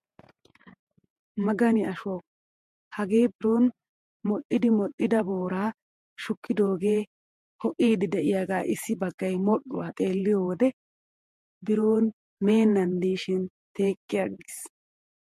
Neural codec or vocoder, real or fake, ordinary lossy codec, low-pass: vocoder, 48 kHz, 128 mel bands, Vocos; fake; MP3, 64 kbps; 14.4 kHz